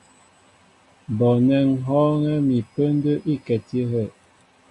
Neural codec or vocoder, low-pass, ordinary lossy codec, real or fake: none; 10.8 kHz; AAC, 48 kbps; real